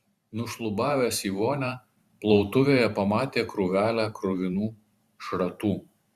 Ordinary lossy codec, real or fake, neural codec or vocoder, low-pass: Opus, 64 kbps; fake; vocoder, 44.1 kHz, 128 mel bands every 512 samples, BigVGAN v2; 14.4 kHz